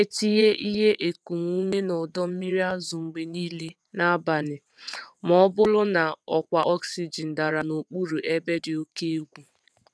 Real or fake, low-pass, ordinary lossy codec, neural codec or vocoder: fake; none; none; vocoder, 22.05 kHz, 80 mel bands, WaveNeXt